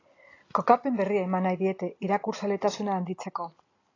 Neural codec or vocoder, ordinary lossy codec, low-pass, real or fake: none; AAC, 32 kbps; 7.2 kHz; real